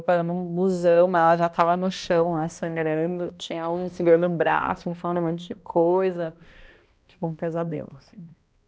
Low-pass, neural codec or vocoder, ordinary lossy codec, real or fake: none; codec, 16 kHz, 1 kbps, X-Codec, HuBERT features, trained on balanced general audio; none; fake